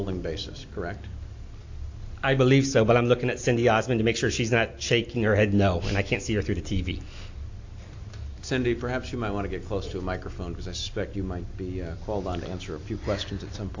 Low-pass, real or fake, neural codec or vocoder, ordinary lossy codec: 7.2 kHz; real; none; AAC, 48 kbps